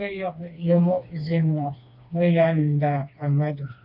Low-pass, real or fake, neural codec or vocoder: 5.4 kHz; fake; codec, 16 kHz, 2 kbps, FreqCodec, smaller model